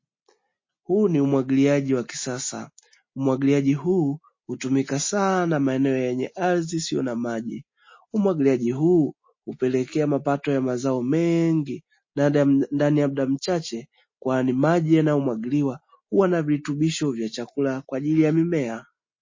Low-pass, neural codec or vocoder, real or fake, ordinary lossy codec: 7.2 kHz; none; real; MP3, 32 kbps